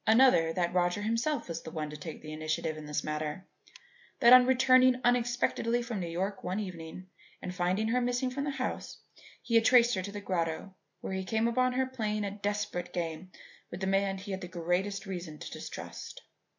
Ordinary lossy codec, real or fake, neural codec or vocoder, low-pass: MP3, 48 kbps; real; none; 7.2 kHz